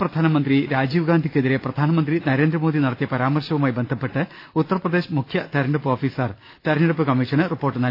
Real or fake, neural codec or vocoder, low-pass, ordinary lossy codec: real; none; 5.4 kHz; AAC, 32 kbps